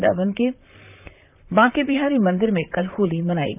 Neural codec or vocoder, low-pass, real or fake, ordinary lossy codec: vocoder, 44.1 kHz, 128 mel bands, Pupu-Vocoder; 3.6 kHz; fake; none